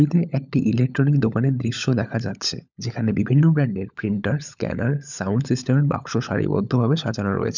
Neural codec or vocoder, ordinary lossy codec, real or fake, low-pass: codec, 16 kHz, 16 kbps, FunCodec, trained on LibriTTS, 50 frames a second; none; fake; 7.2 kHz